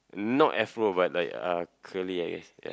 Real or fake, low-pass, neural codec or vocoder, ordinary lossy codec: real; none; none; none